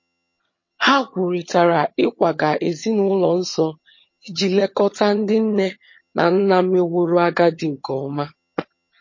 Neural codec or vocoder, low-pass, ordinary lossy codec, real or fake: vocoder, 22.05 kHz, 80 mel bands, HiFi-GAN; 7.2 kHz; MP3, 32 kbps; fake